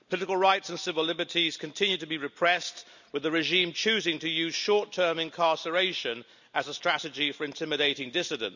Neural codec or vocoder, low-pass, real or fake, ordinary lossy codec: none; 7.2 kHz; real; none